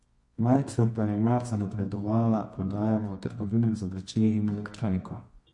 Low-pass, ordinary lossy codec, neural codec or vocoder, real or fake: 10.8 kHz; MP3, 48 kbps; codec, 24 kHz, 0.9 kbps, WavTokenizer, medium music audio release; fake